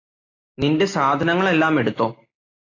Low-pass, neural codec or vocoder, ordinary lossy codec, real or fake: 7.2 kHz; none; AAC, 32 kbps; real